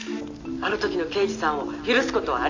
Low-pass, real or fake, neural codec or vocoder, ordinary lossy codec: 7.2 kHz; real; none; AAC, 48 kbps